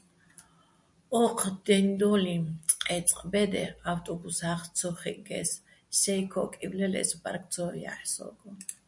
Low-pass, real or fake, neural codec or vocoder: 10.8 kHz; real; none